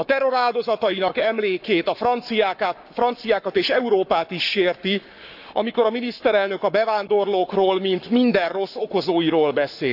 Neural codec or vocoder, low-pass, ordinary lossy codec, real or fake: codec, 44.1 kHz, 7.8 kbps, Pupu-Codec; 5.4 kHz; none; fake